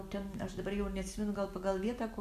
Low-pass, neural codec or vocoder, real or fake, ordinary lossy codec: 14.4 kHz; none; real; AAC, 64 kbps